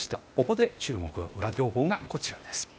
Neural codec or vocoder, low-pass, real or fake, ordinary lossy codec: codec, 16 kHz, 0.8 kbps, ZipCodec; none; fake; none